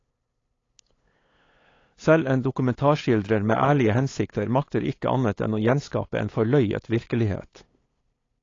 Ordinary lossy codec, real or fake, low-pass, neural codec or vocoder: AAC, 32 kbps; fake; 7.2 kHz; codec, 16 kHz, 8 kbps, FunCodec, trained on LibriTTS, 25 frames a second